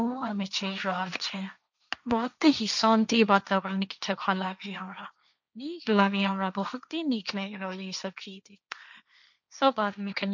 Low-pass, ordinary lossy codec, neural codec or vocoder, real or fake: 7.2 kHz; none; codec, 16 kHz, 1.1 kbps, Voila-Tokenizer; fake